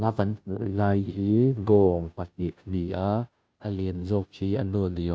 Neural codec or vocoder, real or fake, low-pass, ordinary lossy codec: codec, 16 kHz, 0.5 kbps, FunCodec, trained on Chinese and English, 25 frames a second; fake; none; none